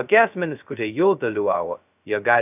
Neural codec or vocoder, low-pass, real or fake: codec, 16 kHz, 0.2 kbps, FocalCodec; 3.6 kHz; fake